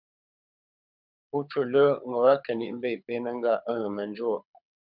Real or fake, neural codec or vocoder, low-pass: fake; codec, 24 kHz, 6 kbps, HILCodec; 5.4 kHz